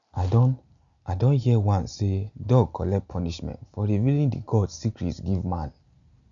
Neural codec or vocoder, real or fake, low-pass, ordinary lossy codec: none; real; 7.2 kHz; none